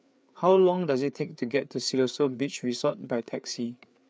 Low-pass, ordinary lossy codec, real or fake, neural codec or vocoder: none; none; fake; codec, 16 kHz, 4 kbps, FreqCodec, larger model